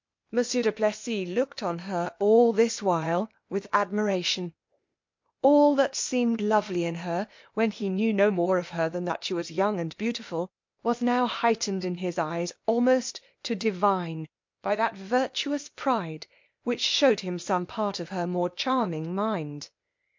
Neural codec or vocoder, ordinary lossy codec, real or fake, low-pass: codec, 16 kHz, 0.8 kbps, ZipCodec; MP3, 48 kbps; fake; 7.2 kHz